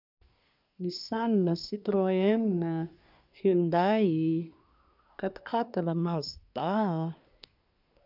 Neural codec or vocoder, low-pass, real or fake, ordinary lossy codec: codec, 24 kHz, 1 kbps, SNAC; 5.4 kHz; fake; none